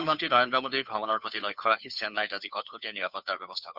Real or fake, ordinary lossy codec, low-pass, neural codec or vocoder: fake; none; 5.4 kHz; codec, 16 kHz, 2 kbps, FunCodec, trained on Chinese and English, 25 frames a second